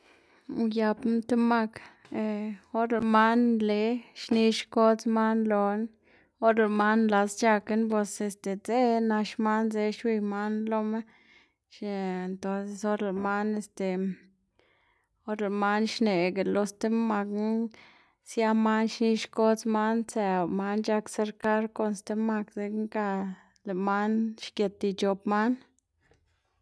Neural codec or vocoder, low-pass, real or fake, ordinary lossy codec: none; none; real; none